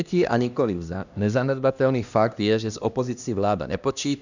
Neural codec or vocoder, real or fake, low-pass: codec, 16 kHz, 1 kbps, X-Codec, HuBERT features, trained on LibriSpeech; fake; 7.2 kHz